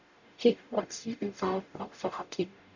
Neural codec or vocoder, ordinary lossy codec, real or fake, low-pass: codec, 44.1 kHz, 0.9 kbps, DAC; none; fake; 7.2 kHz